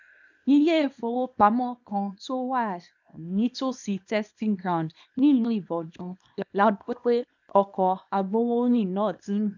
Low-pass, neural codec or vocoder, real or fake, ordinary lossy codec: 7.2 kHz; codec, 24 kHz, 0.9 kbps, WavTokenizer, small release; fake; none